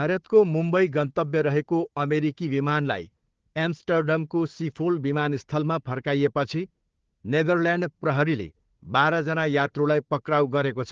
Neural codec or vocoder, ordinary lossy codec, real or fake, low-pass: codec, 16 kHz, 4 kbps, FunCodec, trained on Chinese and English, 50 frames a second; Opus, 16 kbps; fake; 7.2 kHz